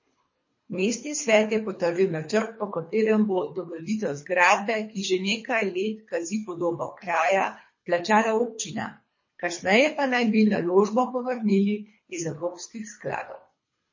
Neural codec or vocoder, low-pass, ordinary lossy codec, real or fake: codec, 24 kHz, 3 kbps, HILCodec; 7.2 kHz; MP3, 32 kbps; fake